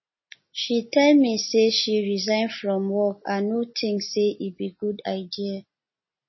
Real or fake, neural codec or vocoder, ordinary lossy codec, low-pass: real; none; MP3, 24 kbps; 7.2 kHz